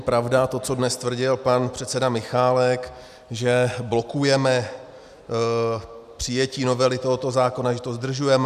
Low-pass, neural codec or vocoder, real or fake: 14.4 kHz; none; real